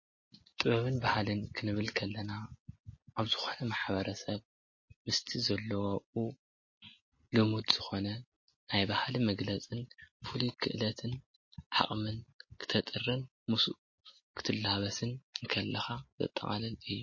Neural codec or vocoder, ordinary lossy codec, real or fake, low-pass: none; MP3, 32 kbps; real; 7.2 kHz